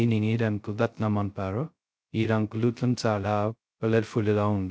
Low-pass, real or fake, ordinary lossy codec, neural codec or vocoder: none; fake; none; codec, 16 kHz, 0.2 kbps, FocalCodec